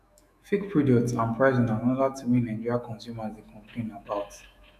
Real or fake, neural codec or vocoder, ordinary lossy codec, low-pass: fake; autoencoder, 48 kHz, 128 numbers a frame, DAC-VAE, trained on Japanese speech; none; 14.4 kHz